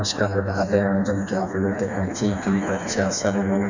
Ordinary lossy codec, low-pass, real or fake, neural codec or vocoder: Opus, 64 kbps; 7.2 kHz; fake; codec, 16 kHz, 2 kbps, FreqCodec, smaller model